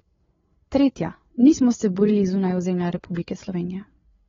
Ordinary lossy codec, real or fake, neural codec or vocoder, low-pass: AAC, 24 kbps; fake; codec, 16 kHz, 8 kbps, FreqCodec, larger model; 7.2 kHz